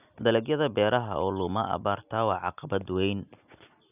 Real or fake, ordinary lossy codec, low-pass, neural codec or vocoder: real; none; 3.6 kHz; none